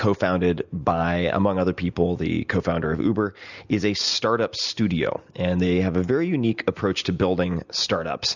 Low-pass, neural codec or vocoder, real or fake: 7.2 kHz; none; real